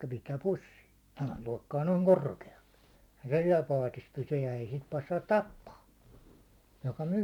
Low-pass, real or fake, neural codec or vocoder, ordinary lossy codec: 19.8 kHz; fake; vocoder, 44.1 kHz, 128 mel bands every 512 samples, BigVGAN v2; none